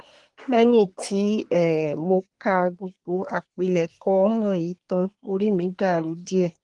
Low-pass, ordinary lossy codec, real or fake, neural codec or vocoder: 10.8 kHz; Opus, 24 kbps; fake; codec, 24 kHz, 1 kbps, SNAC